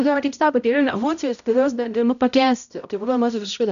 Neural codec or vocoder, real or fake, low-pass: codec, 16 kHz, 0.5 kbps, X-Codec, HuBERT features, trained on balanced general audio; fake; 7.2 kHz